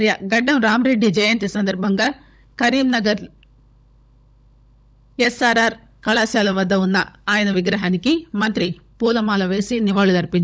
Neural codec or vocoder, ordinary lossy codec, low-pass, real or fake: codec, 16 kHz, 16 kbps, FunCodec, trained on LibriTTS, 50 frames a second; none; none; fake